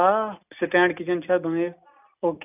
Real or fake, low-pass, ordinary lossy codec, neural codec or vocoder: real; 3.6 kHz; none; none